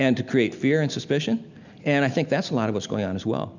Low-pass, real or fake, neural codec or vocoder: 7.2 kHz; real; none